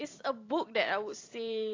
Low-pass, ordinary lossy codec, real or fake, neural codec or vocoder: 7.2 kHz; AAC, 32 kbps; fake; vocoder, 44.1 kHz, 128 mel bands every 256 samples, BigVGAN v2